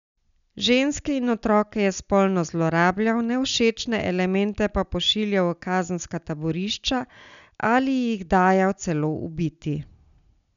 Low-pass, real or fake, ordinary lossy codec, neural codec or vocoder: 7.2 kHz; real; none; none